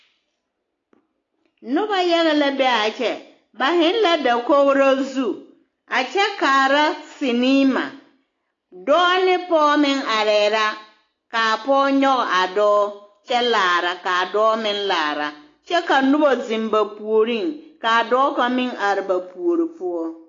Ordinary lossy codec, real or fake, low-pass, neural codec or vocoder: AAC, 32 kbps; real; 7.2 kHz; none